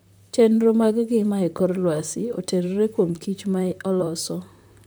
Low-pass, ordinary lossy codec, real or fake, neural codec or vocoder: none; none; fake; vocoder, 44.1 kHz, 128 mel bands, Pupu-Vocoder